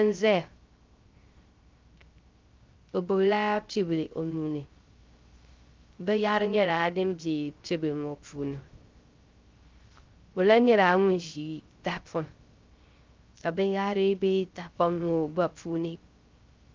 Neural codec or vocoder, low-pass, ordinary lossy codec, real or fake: codec, 16 kHz, 0.3 kbps, FocalCodec; 7.2 kHz; Opus, 32 kbps; fake